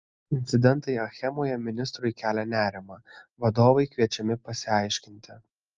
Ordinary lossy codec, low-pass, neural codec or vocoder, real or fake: Opus, 24 kbps; 7.2 kHz; none; real